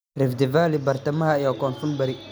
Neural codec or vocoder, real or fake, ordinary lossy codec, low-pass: none; real; none; none